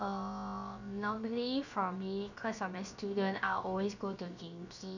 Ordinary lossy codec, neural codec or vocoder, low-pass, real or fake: none; codec, 16 kHz, 0.7 kbps, FocalCodec; 7.2 kHz; fake